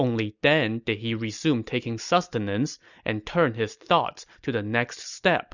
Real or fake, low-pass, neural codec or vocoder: real; 7.2 kHz; none